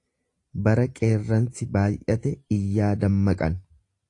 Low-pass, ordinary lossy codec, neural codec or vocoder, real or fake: 10.8 kHz; AAC, 48 kbps; none; real